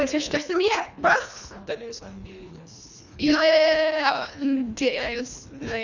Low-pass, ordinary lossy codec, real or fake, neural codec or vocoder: 7.2 kHz; none; fake; codec, 24 kHz, 1.5 kbps, HILCodec